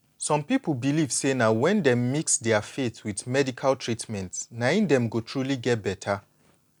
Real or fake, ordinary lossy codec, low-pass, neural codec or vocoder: real; none; 19.8 kHz; none